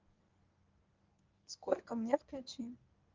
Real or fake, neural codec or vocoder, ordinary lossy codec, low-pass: fake; codec, 24 kHz, 0.9 kbps, WavTokenizer, medium speech release version 1; Opus, 32 kbps; 7.2 kHz